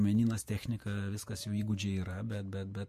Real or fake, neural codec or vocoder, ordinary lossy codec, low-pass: fake; vocoder, 48 kHz, 128 mel bands, Vocos; MP3, 64 kbps; 14.4 kHz